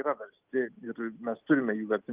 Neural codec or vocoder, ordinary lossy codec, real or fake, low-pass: none; Opus, 24 kbps; real; 3.6 kHz